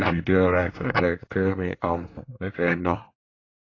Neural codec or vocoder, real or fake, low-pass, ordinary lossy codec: codec, 24 kHz, 1 kbps, SNAC; fake; 7.2 kHz; AAC, 32 kbps